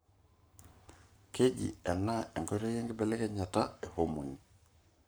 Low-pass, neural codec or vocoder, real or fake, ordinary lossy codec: none; codec, 44.1 kHz, 7.8 kbps, Pupu-Codec; fake; none